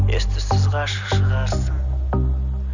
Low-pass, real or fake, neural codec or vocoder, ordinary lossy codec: 7.2 kHz; real; none; none